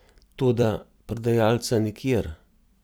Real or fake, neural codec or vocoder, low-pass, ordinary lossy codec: fake; vocoder, 44.1 kHz, 128 mel bands every 256 samples, BigVGAN v2; none; none